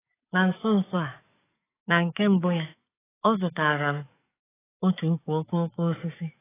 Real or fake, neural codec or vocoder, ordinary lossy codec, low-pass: fake; codec, 44.1 kHz, 7.8 kbps, DAC; AAC, 16 kbps; 3.6 kHz